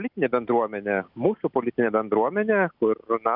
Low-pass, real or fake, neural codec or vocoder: 5.4 kHz; real; none